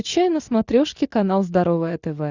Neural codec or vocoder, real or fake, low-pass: none; real; 7.2 kHz